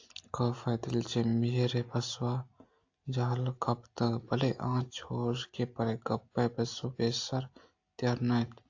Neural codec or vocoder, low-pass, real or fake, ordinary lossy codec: none; 7.2 kHz; real; AAC, 48 kbps